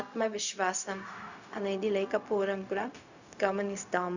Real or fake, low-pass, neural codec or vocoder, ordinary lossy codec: fake; 7.2 kHz; codec, 16 kHz, 0.4 kbps, LongCat-Audio-Codec; none